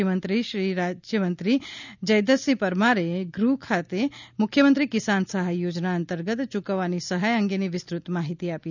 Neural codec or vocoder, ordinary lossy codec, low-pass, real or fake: none; none; 7.2 kHz; real